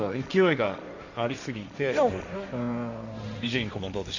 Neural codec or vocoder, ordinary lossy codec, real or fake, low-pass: codec, 16 kHz, 1.1 kbps, Voila-Tokenizer; none; fake; 7.2 kHz